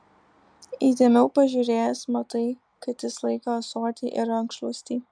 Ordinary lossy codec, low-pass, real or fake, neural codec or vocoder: MP3, 96 kbps; 9.9 kHz; real; none